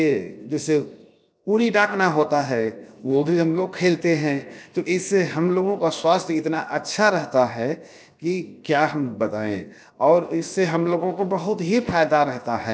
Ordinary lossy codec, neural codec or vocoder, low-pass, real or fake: none; codec, 16 kHz, 0.7 kbps, FocalCodec; none; fake